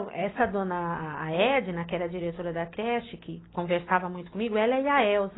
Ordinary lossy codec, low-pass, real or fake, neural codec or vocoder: AAC, 16 kbps; 7.2 kHz; real; none